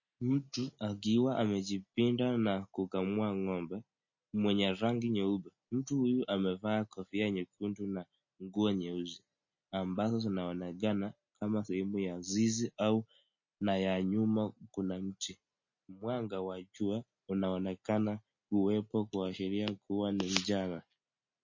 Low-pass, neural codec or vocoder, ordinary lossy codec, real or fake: 7.2 kHz; none; MP3, 32 kbps; real